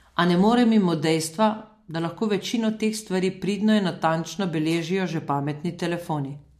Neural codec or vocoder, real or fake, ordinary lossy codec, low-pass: none; real; MP3, 64 kbps; 14.4 kHz